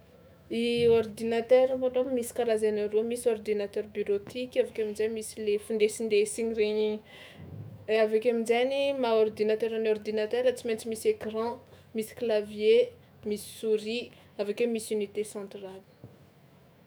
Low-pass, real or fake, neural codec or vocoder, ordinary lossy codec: none; fake; autoencoder, 48 kHz, 128 numbers a frame, DAC-VAE, trained on Japanese speech; none